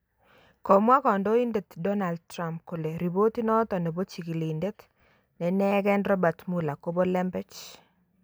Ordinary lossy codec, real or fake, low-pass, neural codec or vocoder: none; real; none; none